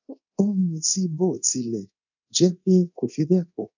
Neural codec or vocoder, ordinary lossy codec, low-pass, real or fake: codec, 24 kHz, 0.9 kbps, DualCodec; none; 7.2 kHz; fake